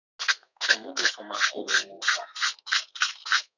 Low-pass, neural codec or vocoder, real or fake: 7.2 kHz; codec, 32 kHz, 1.9 kbps, SNAC; fake